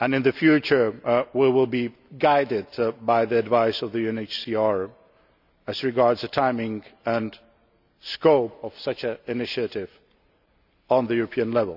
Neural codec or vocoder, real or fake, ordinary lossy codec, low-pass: none; real; none; 5.4 kHz